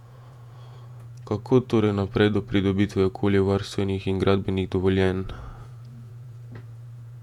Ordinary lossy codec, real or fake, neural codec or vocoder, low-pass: none; fake; vocoder, 48 kHz, 128 mel bands, Vocos; 19.8 kHz